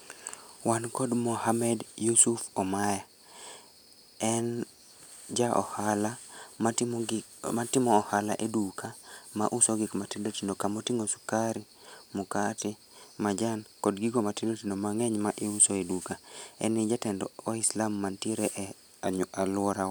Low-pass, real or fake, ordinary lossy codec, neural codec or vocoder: none; real; none; none